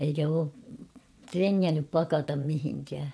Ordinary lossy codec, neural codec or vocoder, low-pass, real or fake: none; vocoder, 22.05 kHz, 80 mel bands, WaveNeXt; none; fake